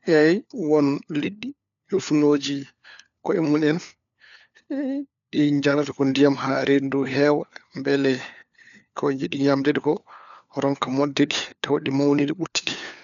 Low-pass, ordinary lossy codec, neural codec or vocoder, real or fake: 7.2 kHz; none; codec, 16 kHz, 4 kbps, FunCodec, trained on LibriTTS, 50 frames a second; fake